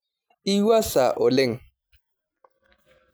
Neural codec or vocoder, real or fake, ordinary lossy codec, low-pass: none; real; none; none